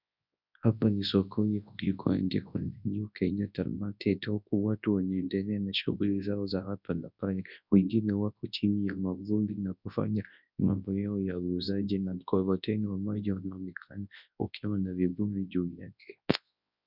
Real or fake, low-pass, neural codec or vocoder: fake; 5.4 kHz; codec, 24 kHz, 0.9 kbps, WavTokenizer, large speech release